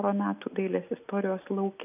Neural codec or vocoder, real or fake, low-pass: none; real; 3.6 kHz